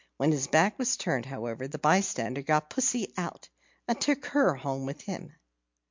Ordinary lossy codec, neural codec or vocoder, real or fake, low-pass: MP3, 64 kbps; none; real; 7.2 kHz